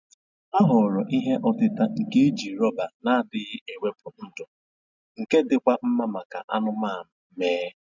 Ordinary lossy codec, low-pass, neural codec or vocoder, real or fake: none; 7.2 kHz; none; real